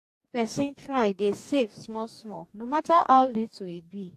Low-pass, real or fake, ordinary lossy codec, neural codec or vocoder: 14.4 kHz; fake; AAC, 64 kbps; codec, 44.1 kHz, 2.6 kbps, DAC